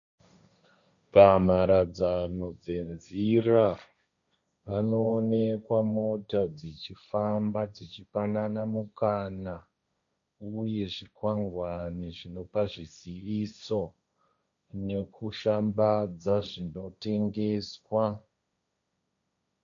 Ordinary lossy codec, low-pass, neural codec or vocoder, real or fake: MP3, 96 kbps; 7.2 kHz; codec, 16 kHz, 1.1 kbps, Voila-Tokenizer; fake